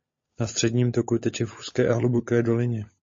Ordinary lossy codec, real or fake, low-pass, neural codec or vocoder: MP3, 32 kbps; fake; 7.2 kHz; codec, 16 kHz, 16 kbps, FunCodec, trained on LibriTTS, 50 frames a second